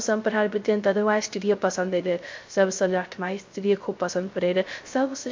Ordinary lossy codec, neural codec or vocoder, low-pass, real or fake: MP3, 48 kbps; codec, 16 kHz, 0.3 kbps, FocalCodec; 7.2 kHz; fake